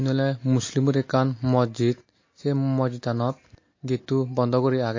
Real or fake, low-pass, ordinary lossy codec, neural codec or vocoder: real; 7.2 kHz; MP3, 32 kbps; none